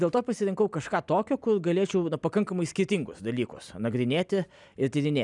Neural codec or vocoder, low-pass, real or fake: none; 10.8 kHz; real